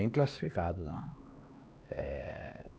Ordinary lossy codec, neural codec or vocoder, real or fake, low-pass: none; codec, 16 kHz, 2 kbps, X-Codec, HuBERT features, trained on LibriSpeech; fake; none